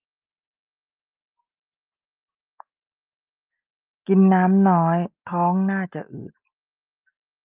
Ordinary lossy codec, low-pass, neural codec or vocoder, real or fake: Opus, 32 kbps; 3.6 kHz; none; real